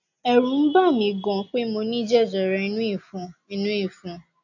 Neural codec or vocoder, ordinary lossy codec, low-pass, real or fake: none; none; 7.2 kHz; real